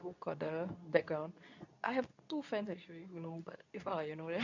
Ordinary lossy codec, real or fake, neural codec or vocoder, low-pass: none; fake; codec, 24 kHz, 0.9 kbps, WavTokenizer, medium speech release version 2; 7.2 kHz